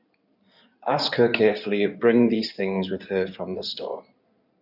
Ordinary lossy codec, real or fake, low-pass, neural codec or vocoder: none; fake; 5.4 kHz; codec, 16 kHz in and 24 kHz out, 2.2 kbps, FireRedTTS-2 codec